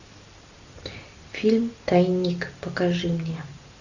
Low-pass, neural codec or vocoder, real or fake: 7.2 kHz; none; real